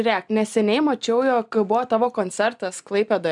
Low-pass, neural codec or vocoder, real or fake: 10.8 kHz; none; real